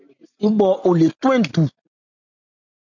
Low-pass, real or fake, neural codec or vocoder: 7.2 kHz; real; none